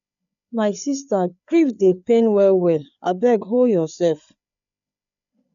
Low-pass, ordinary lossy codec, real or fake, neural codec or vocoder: 7.2 kHz; none; fake; codec, 16 kHz, 4 kbps, FreqCodec, larger model